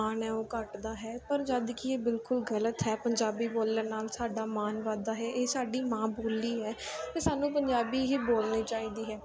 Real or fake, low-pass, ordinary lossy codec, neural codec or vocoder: real; none; none; none